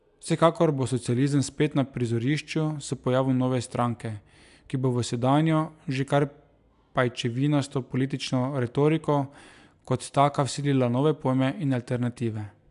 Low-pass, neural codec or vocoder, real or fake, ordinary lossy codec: 10.8 kHz; none; real; AAC, 96 kbps